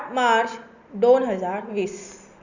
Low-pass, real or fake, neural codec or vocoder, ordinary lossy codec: 7.2 kHz; real; none; Opus, 64 kbps